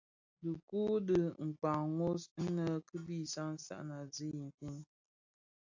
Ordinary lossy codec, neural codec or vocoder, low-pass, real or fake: AAC, 48 kbps; none; 7.2 kHz; real